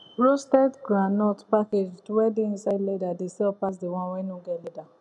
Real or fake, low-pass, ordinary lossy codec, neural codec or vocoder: real; 10.8 kHz; none; none